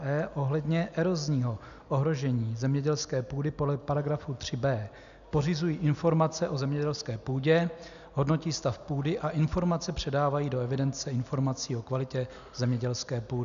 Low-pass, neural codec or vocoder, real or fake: 7.2 kHz; none; real